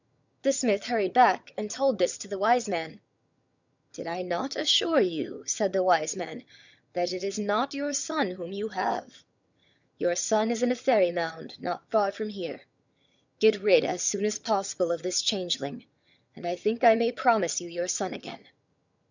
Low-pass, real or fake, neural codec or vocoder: 7.2 kHz; fake; vocoder, 22.05 kHz, 80 mel bands, HiFi-GAN